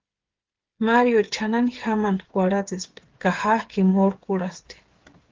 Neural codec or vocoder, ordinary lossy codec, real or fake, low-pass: codec, 16 kHz, 8 kbps, FreqCodec, smaller model; Opus, 16 kbps; fake; 7.2 kHz